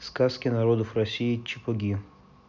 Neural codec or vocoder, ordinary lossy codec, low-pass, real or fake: none; none; 7.2 kHz; real